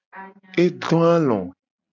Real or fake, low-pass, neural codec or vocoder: real; 7.2 kHz; none